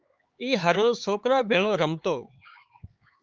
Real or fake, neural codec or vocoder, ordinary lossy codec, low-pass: fake; codec, 16 kHz, 4 kbps, X-Codec, HuBERT features, trained on LibriSpeech; Opus, 32 kbps; 7.2 kHz